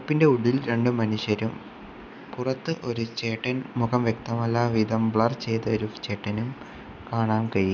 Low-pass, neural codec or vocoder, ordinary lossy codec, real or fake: none; none; none; real